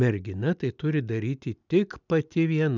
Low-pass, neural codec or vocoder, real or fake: 7.2 kHz; none; real